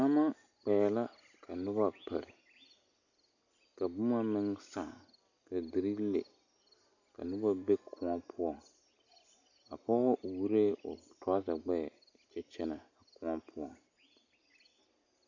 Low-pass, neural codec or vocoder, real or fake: 7.2 kHz; none; real